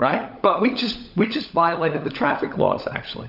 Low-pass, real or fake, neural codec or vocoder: 5.4 kHz; fake; codec, 16 kHz, 16 kbps, FunCodec, trained on LibriTTS, 50 frames a second